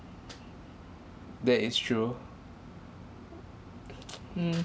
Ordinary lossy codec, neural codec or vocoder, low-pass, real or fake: none; none; none; real